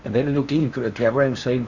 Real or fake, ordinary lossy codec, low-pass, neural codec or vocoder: fake; none; 7.2 kHz; codec, 16 kHz in and 24 kHz out, 0.8 kbps, FocalCodec, streaming, 65536 codes